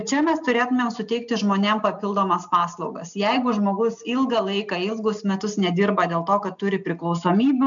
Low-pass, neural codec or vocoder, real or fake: 7.2 kHz; none; real